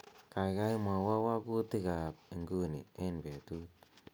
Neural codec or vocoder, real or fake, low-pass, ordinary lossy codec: none; real; none; none